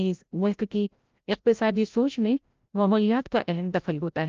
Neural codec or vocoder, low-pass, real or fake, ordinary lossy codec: codec, 16 kHz, 0.5 kbps, FreqCodec, larger model; 7.2 kHz; fake; Opus, 24 kbps